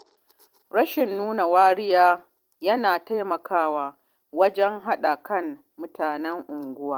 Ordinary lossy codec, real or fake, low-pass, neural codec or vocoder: Opus, 24 kbps; fake; 19.8 kHz; vocoder, 44.1 kHz, 128 mel bands every 256 samples, BigVGAN v2